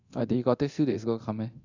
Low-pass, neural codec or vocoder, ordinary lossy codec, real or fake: 7.2 kHz; codec, 24 kHz, 0.9 kbps, DualCodec; AAC, 48 kbps; fake